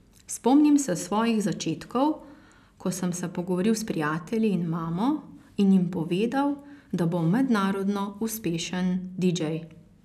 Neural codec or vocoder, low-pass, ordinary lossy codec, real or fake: none; 14.4 kHz; none; real